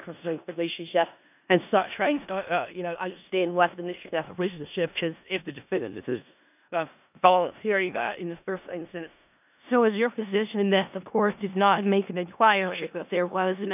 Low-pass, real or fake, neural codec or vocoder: 3.6 kHz; fake; codec, 16 kHz in and 24 kHz out, 0.4 kbps, LongCat-Audio-Codec, four codebook decoder